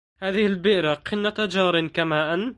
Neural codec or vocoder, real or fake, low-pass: none; real; 10.8 kHz